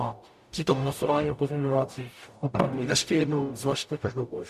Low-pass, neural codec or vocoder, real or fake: 14.4 kHz; codec, 44.1 kHz, 0.9 kbps, DAC; fake